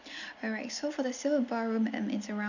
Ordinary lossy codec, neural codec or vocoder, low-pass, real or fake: none; none; 7.2 kHz; real